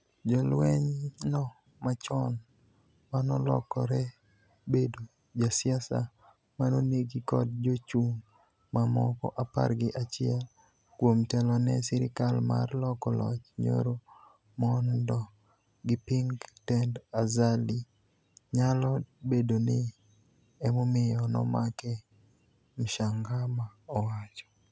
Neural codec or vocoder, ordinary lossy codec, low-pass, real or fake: none; none; none; real